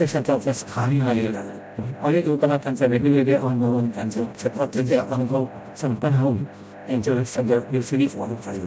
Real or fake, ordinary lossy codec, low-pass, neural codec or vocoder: fake; none; none; codec, 16 kHz, 0.5 kbps, FreqCodec, smaller model